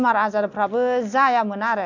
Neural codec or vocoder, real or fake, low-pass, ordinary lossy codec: none; real; 7.2 kHz; none